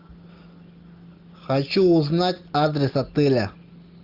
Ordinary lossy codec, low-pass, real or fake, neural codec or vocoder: Opus, 24 kbps; 5.4 kHz; real; none